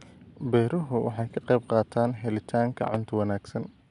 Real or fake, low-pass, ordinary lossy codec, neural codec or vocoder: real; 10.8 kHz; none; none